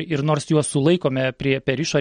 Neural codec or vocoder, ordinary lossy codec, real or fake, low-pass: none; MP3, 48 kbps; real; 9.9 kHz